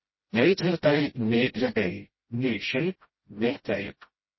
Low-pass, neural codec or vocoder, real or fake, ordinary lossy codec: 7.2 kHz; codec, 16 kHz, 0.5 kbps, FreqCodec, smaller model; fake; MP3, 24 kbps